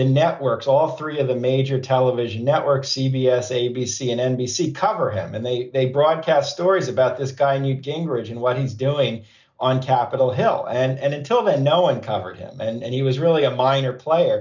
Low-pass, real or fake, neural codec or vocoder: 7.2 kHz; real; none